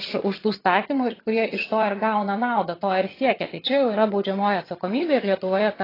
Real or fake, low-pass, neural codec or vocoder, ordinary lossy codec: fake; 5.4 kHz; vocoder, 22.05 kHz, 80 mel bands, HiFi-GAN; AAC, 24 kbps